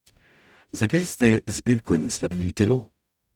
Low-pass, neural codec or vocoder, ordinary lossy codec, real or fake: 19.8 kHz; codec, 44.1 kHz, 0.9 kbps, DAC; none; fake